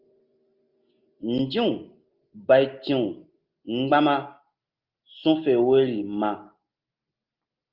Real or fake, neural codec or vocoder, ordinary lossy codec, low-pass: real; none; Opus, 32 kbps; 5.4 kHz